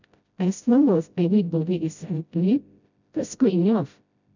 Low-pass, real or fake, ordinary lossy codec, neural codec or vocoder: 7.2 kHz; fake; none; codec, 16 kHz, 0.5 kbps, FreqCodec, smaller model